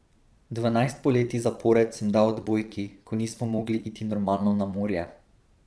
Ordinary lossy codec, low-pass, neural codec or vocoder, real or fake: none; none; vocoder, 22.05 kHz, 80 mel bands, Vocos; fake